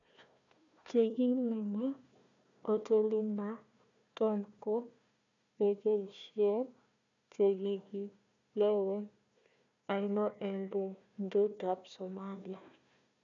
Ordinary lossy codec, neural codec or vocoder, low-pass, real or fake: none; codec, 16 kHz, 1 kbps, FunCodec, trained on Chinese and English, 50 frames a second; 7.2 kHz; fake